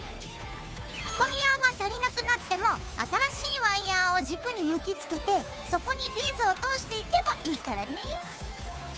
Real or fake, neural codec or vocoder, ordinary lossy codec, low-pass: fake; codec, 16 kHz, 2 kbps, FunCodec, trained on Chinese and English, 25 frames a second; none; none